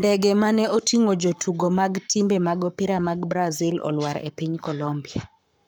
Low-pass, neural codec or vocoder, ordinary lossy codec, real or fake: 19.8 kHz; codec, 44.1 kHz, 7.8 kbps, Pupu-Codec; none; fake